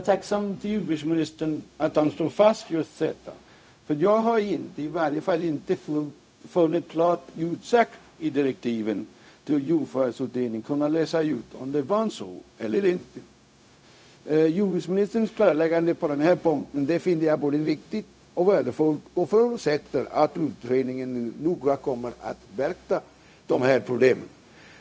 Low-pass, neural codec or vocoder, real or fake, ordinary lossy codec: none; codec, 16 kHz, 0.4 kbps, LongCat-Audio-Codec; fake; none